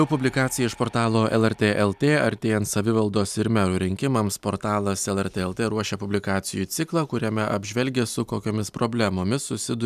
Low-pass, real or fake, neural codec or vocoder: 14.4 kHz; real; none